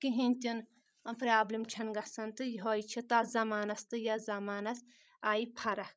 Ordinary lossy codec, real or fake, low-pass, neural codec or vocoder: none; fake; none; codec, 16 kHz, 16 kbps, FreqCodec, larger model